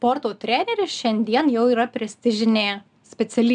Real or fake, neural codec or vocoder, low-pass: real; none; 9.9 kHz